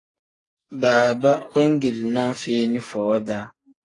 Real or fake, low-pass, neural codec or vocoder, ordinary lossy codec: fake; 10.8 kHz; codec, 44.1 kHz, 2.6 kbps, SNAC; AAC, 32 kbps